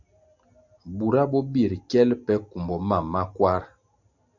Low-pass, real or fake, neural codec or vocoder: 7.2 kHz; real; none